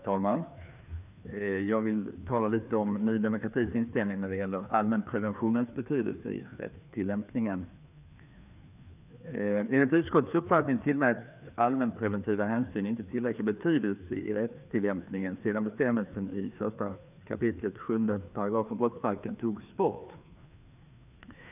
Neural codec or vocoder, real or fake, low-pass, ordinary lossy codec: codec, 16 kHz, 2 kbps, FreqCodec, larger model; fake; 3.6 kHz; none